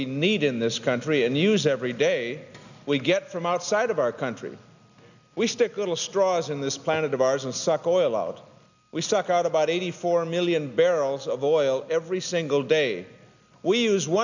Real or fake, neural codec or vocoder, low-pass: real; none; 7.2 kHz